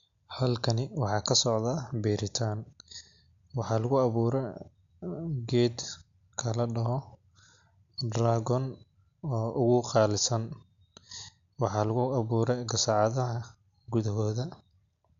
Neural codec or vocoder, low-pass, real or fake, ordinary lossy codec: none; 7.2 kHz; real; AAC, 64 kbps